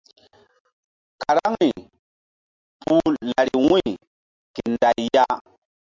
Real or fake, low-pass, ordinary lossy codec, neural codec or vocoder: real; 7.2 kHz; MP3, 64 kbps; none